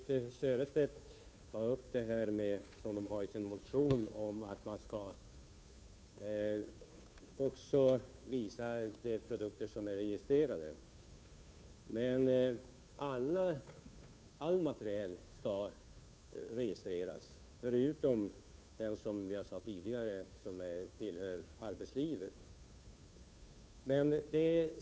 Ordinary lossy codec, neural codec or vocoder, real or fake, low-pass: none; codec, 16 kHz, 2 kbps, FunCodec, trained on Chinese and English, 25 frames a second; fake; none